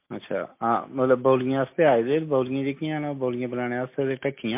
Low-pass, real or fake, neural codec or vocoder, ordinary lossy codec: 3.6 kHz; real; none; MP3, 24 kbps